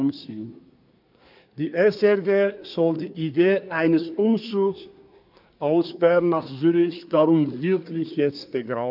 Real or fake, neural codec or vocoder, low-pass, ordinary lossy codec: fake; codec, 24 kHz, 1 kbps, SNAC; 5.4 kHz; none